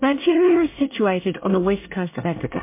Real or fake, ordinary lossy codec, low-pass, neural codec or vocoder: fake; MP3, 24 kbps; 3.6 kHz; codec, 24 kHz, 1 kbps, SNAC